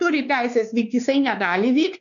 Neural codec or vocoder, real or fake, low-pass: codec, 16 kHz, 2 kbps, X-Codec, WavLM features, trained on Multilingual LibriSpeech; fake; 7.2 kHz